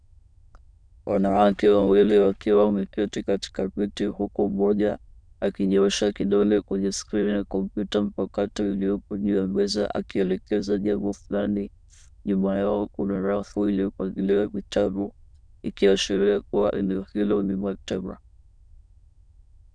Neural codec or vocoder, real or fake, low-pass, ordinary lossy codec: autoencoder, 22.05 kHz, a latent of 192 numbers a frame, VITS, trained on many speakers; fake; 9.9 kHz; MP3, 96 kbps